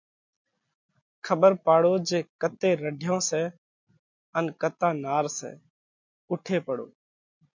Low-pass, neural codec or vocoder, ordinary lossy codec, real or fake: 7.2 kHz; none; MP3, 64 kbps; real